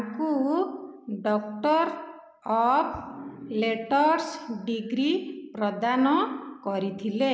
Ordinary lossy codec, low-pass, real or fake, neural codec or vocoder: none; none; real; none